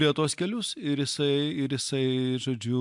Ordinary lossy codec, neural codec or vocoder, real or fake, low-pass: MP3, 64 kbps; none; real; 10.8 kHz